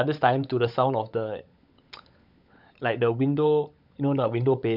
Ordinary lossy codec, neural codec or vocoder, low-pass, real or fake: none; codec, 16 kHz, 16 kbps, FunCodec, trained on LibriTTS, 50 frames a second; 5.4 kHz; fake